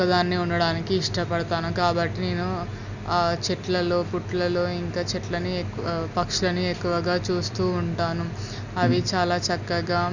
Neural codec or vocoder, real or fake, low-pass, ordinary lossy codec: none; real; 7.2 kHz; none